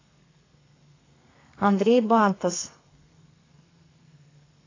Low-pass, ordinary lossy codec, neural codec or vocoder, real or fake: 7.2 kHz; AAC, 32 kbps; codec, 32 kHz, 1.9 kbps, SNAC; fake